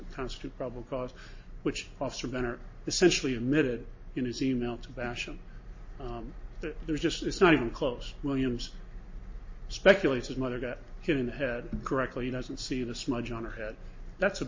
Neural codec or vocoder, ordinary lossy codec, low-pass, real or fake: none; MP3, 32 kbps; 7.2 kHz; real